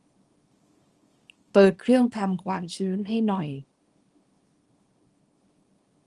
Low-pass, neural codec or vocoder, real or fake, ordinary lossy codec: 10.8 kHz; codec, 24 kHz, 0.9 kbps, WavTokenizer, small release; fake; Opus, 24 kbps